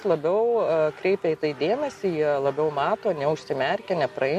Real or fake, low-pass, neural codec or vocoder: real; 14.4 kHz; none